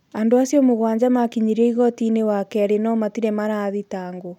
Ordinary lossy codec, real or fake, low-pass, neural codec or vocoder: none; real; 19.8 kHz; none